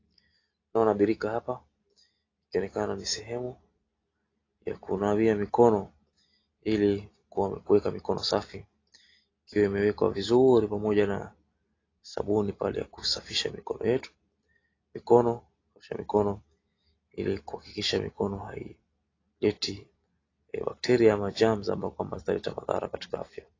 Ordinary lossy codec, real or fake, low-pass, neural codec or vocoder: AAC, 32 kbps; real; 7.2 kHz; none